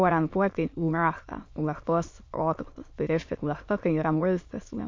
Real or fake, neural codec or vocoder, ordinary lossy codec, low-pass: fake; autoencoder, 22.05 kHz, a latent of 192 numbers a frame, VITS, trained on many speakers; MP3, 48 kbps; 7.2 kHz